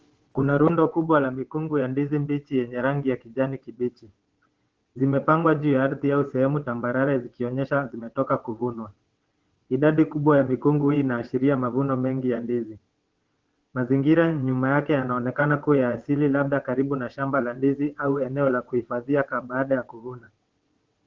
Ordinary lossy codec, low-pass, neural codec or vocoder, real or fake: Opus, 16 kbps; 7.2 kHz; vocoder, 22.05 kHz, 80 mel bands, WaveNeXt; fake